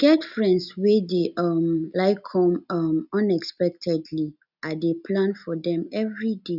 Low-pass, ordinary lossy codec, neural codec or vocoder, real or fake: 5.4 kHz; none; none; real